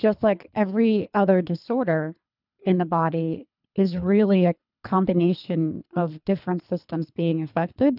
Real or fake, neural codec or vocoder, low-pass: fake; codec, 24 kHz, 3 kbps, HILCodec; 5.4 kHz